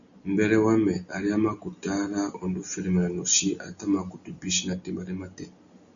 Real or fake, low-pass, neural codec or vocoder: real; 7.2 kHz; none